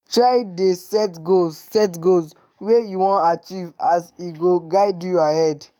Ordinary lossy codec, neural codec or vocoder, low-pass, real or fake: none; codec, 44.1 kHz, 7.8 kbps, DAC; 19.8 kHz; fake